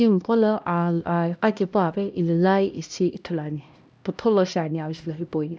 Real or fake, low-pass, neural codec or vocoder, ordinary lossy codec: fake; none; codec, 16 kHz, 1 kbps, X-Codec, HuBERT features, trained on LibriSpeech; none